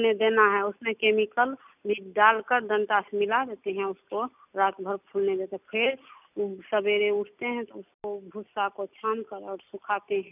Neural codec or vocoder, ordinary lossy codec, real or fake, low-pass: none; none; real; 3.6 kHz